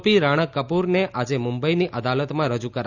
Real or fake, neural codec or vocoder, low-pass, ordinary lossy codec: real; none; 7.2 kHz; none